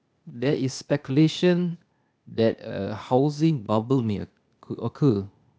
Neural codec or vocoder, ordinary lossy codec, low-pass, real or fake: codec, 16 kHz, 0.8 kbps, ZipCodec; none; none; fake